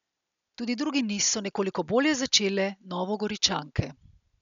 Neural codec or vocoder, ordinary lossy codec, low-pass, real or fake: none; none; 7.2 kHz; real